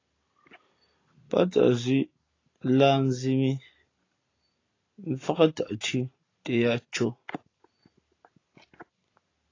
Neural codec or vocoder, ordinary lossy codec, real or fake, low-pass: none; AAC, 32 kbps; real; 7.2 kHz